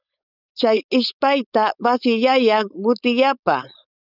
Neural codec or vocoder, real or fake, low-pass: codec, 16 kHz, 4.8 kbps, FACodec; fake; 5.4 kHz